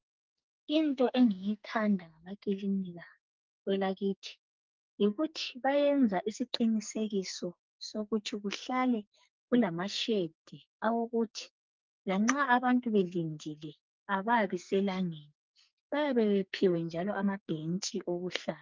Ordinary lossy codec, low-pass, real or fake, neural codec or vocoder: Opus, 24 kbps; 7.2 kHz; fake; codec, 44.1 kHz, 2.6 kbps, SNAC